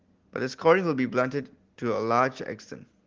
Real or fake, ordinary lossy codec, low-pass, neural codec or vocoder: real; Opus, 16 kbps; 7.2 kHz; none